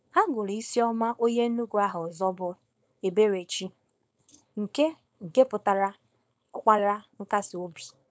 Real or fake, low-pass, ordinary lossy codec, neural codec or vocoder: fake; none; none; codec, 16 kHz, 4.8 kbps, FACodec